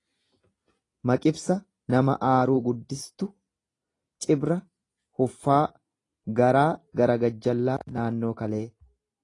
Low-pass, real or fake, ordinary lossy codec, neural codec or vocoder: 10.8 kHz; real; AAC, 32 kbps; none